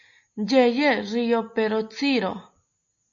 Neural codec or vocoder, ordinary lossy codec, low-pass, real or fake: none; AAC, 64 kbps; 7.2 kHz; real